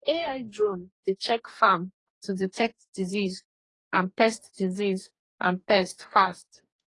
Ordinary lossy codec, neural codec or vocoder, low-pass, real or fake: AAC, 32 kbps; codec, 44.1 kHz, 2.6 kbps, DAC; 10.8 kHz; fake